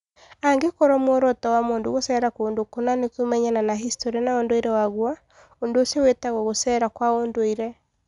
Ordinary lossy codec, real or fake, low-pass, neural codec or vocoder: none; real; 10.8 kHz; none